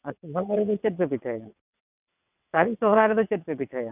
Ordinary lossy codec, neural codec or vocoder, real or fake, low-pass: none; vocoder, 22.05 kHz, 80 mel bands, WaveNeXt; fake; 3.6 kHz